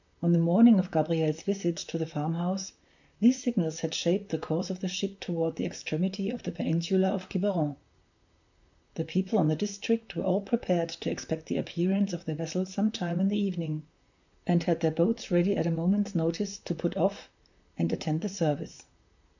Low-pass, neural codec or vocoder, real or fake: 7.2 kHz; vocoder, 44.1 kHz, 128 mel bands, Pupu-Vocoder; fake